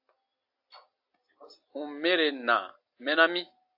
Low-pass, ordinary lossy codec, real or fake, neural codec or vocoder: 5.4 kHz; AAC, 48 kbps; real; none